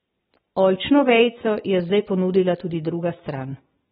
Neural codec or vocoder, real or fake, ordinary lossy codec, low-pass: none; real; AAC, 16 kbps; 19.8 kHz